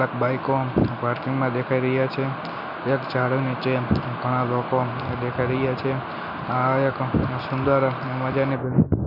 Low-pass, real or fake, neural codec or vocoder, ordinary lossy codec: 5.4 kHz; real; none; none